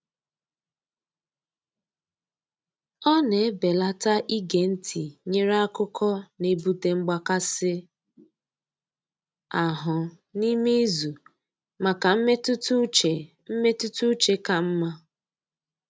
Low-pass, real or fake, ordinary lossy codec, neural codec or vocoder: none; real; none; none